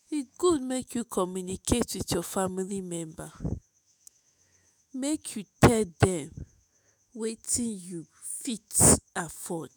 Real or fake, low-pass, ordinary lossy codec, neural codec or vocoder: fake; none; none; autoencoder, 48 kHz, 128 numbers a frame, DAC-VAE, trained on Japanese speech